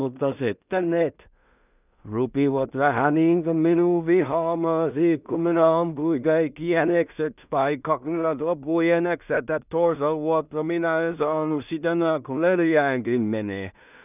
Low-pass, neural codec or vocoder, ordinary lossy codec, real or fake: 3.6 kHz; codec, 16 kHz in and 24 kHz out, 0.4 kbps, LongCat-Audio-Codec, two codebook decoder; none; fake